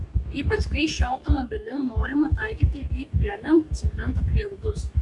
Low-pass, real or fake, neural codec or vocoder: 10.8 kHz; fake; codec, 44.1 kHz, 2.6 kbps, DAC